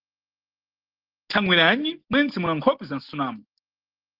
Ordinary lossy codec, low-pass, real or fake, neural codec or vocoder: Opus, 16 kbps; 5.4 kHz; real; none